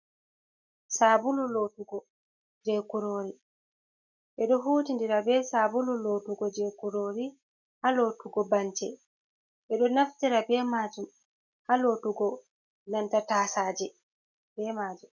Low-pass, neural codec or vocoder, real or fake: 7.2 kHz; none; real